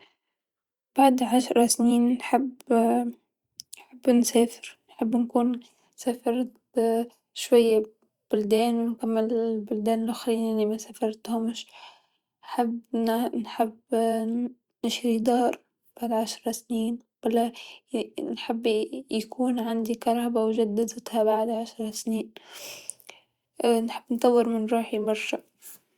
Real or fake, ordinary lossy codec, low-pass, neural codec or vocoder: fake; Opus, 64 kbps; 19.8 kHz; vocoder, 44.1 kHz, 128 mel bands every 512 samples, BigVGAN v2